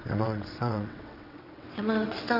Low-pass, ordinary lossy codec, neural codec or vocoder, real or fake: 5.4 kHz; none; vocoder, 22.05 kHz, 80 mel bands, WaveNeXt; fake